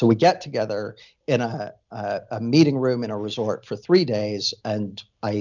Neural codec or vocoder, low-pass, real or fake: none; 7.2 kHz; real